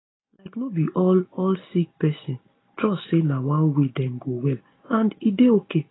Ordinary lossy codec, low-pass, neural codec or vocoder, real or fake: AAC, 16 kbps; 7.2 kHz; none; real